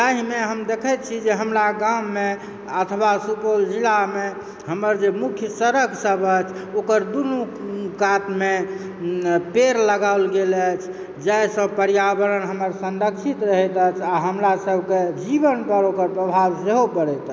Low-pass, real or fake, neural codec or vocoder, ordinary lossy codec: none; real; none; none